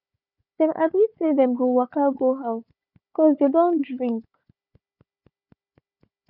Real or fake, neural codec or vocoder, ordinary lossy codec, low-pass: fake; codec, 16 kHz, 4 kbps, FunCodec, trained on Chinese and English, 50 frames a second; none; 5.4 kHz